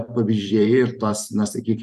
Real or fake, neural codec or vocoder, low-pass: fake; vocoder, 44.1 kHz, 128 mel bands every 512 samples, BigVGAN v2; 14.4 kHz